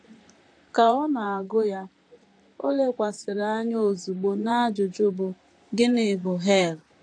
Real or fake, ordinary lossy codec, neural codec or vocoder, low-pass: fake; AAC, 48 kbps; vocoder, 44.1 kHz, 128 mel bands, Pupu-Vocoder; 9.9 kHz